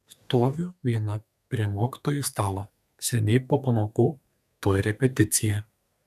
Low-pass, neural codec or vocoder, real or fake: 14.4 kHz; codec, 32 kHz, 1.9 kbps, SNAC; fake